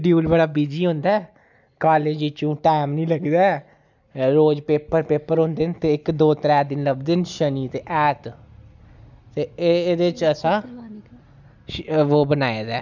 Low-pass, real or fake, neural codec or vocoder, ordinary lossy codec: 7.2 kHz; real; none; none